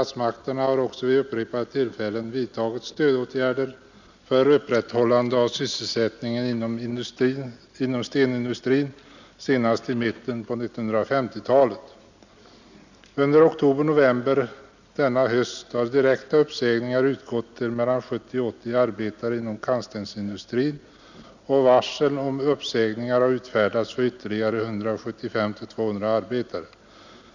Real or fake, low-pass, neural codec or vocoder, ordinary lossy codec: real; 7.2 kHz; none; none